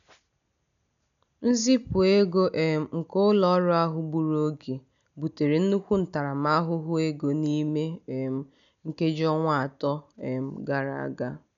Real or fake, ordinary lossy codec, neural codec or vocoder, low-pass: real; none; none; 7.2 kHz